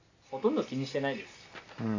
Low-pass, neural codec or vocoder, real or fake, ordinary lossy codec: 7.2 kHz; none; real; AAC, 48 kbps